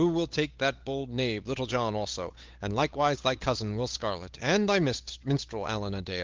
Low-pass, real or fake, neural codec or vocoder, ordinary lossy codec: 7.2 kHz; fake; codec, 16 kHz, 8 kbps, FunCodec, trained on Chinese and English, 25 frames a second; Opus, 32 kbps